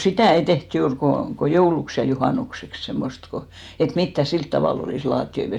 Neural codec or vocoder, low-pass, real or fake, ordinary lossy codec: vocoder, 48 kHz, 128 mel bands, Vocos; 19.8 kHz; fake; none